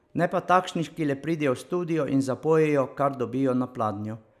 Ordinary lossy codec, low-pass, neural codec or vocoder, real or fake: none; 14.4 kHz; none; real